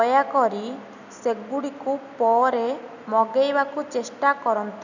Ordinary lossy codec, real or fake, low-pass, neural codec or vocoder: none; real; 7.2 kHz; none